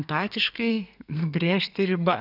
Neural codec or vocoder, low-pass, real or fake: codec, 32 kHz, 1.9 kbps, SNAC; 5.4 kHz; fake